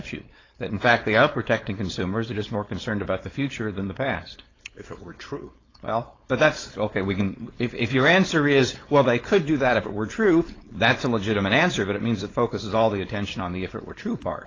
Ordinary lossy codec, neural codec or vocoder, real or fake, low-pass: AAC, 32 kbps; codec, 16 kHz, 4.8 kbps, FACodec; fake; 7.2 kHz